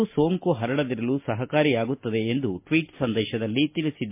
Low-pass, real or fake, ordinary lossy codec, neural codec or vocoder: 3.6 kHz; real; MP3, 24 kbps; none